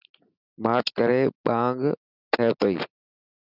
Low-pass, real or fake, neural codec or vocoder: 5.4 kHz; real; none